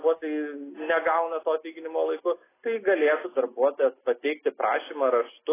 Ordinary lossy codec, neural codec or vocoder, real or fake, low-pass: AAC, 16 kbps; none; real; 3.6 kHz